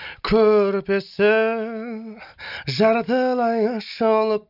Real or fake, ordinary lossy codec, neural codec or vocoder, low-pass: real; none; none; 5.4 kHz